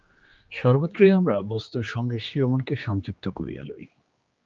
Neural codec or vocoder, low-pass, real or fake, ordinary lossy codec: codec, 16 kHz, 2 kbps, X-Codec, HuBERT features, trained on general audio; 7.2 kHz; fake; Opus, 24 kbps